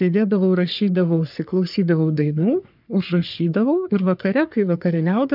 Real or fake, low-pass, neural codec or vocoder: fake; 5.4 kHz; codec, 44.1 kHz, 3.4 kbps, Pupu-Codec